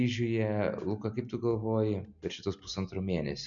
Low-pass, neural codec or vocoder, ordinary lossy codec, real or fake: 7.2 kHz; none; MP3, 96 kbps; real